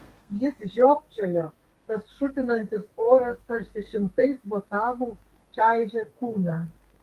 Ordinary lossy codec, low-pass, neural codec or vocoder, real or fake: Opus, 32 kbps; 14.4 kHz; codec, 32 kHz, 1.9 kbps, SNAC; fake